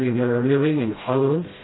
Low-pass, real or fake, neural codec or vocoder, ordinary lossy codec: 7.2 kHz; fake; codec, 16 kHz, 0.5 kbps, FreqCodec, smaller model; AAC, 16 kbps